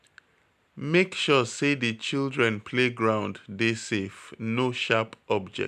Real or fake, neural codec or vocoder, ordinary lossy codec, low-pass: real; none; none; 14.4 kHz